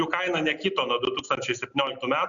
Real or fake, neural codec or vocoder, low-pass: real; none; 7.2 kHz